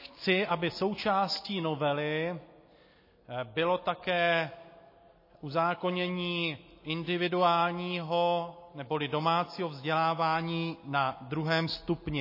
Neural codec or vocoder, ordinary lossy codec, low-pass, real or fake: none; MP3, 24 kbps; 5.4 kHz; real